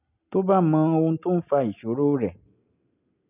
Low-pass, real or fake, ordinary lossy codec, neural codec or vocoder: 3.6 kHz; real; none; none